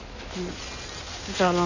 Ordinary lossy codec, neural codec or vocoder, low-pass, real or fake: none; codec, 16 kHz in and 24 kHz out, 2.2 kbps, FireRedTTS-2 codec; 7.2 kHz; fake